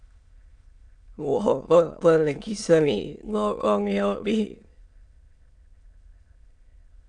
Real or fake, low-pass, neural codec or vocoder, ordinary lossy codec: fake; 9.9 kHz; autoencoder, 22.05 kHz, a latent of 192 numbers a frame, VITS, trained on many speakers; AAC, 64 kbps